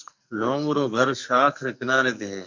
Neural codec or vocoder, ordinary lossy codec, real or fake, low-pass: codec, 44.1 kHz, 2.6 kbps, SNAC; MP3, 64 kbps; fake; 7.2 kHz